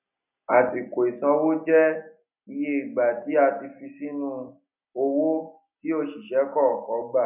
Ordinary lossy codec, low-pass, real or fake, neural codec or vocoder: none; 3.6 kHz; real; none